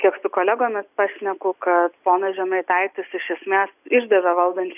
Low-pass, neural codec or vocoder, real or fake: 3.6 kHz; none; real